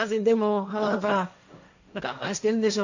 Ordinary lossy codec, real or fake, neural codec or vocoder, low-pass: none; fake; codec, 16 kHz, 1.1 kbps, Voila-Tokenizer; 7.2 kHz